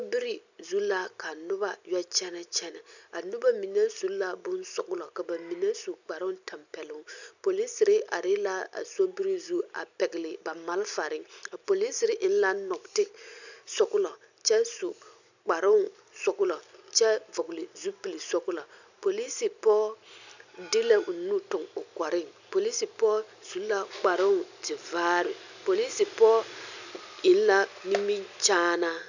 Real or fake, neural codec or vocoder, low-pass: real; none; 7.2 kHz